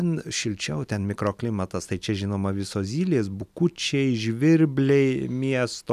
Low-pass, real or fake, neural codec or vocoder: 14.4 kHz; real; none